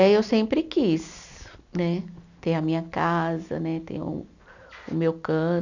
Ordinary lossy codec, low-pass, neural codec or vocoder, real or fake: none; 7.2 kHz; none; real